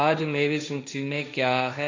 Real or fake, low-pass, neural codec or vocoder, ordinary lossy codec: fake; 7.2 kHz; codec, 16 kHz, 1.1 kbps, Voila-Tokenizer; MP3, 64 kbps